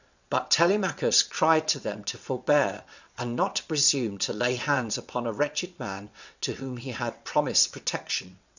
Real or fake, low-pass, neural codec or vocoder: fake; 7.2 kHz; vocoder, 22.05 kHz, 80 mel bands, WaveNeXt